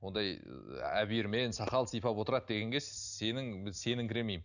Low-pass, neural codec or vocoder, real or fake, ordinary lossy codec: 7.2 kHz; none; real; none